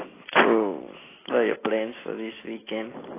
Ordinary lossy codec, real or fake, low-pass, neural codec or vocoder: AAC, 16 kbps; real; 3.6 kHz; none